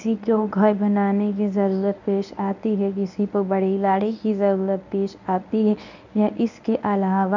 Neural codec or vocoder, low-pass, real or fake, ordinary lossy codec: codec, 24 kHz, 0.9 kbps, WavTokenizer, medium speech release version 1; 7.2 kHz; fake; none